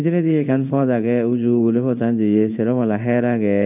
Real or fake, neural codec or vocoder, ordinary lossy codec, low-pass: fake; codec, 16 kHz in and 24 kHz out, 1 kbps, XY-Tokenizer; none; 3.6 kHz